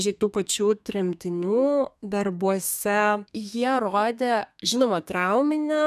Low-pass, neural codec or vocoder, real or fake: 14.4 kHz; codec, 32 kHz, 1.9 kbps, SNAC; fake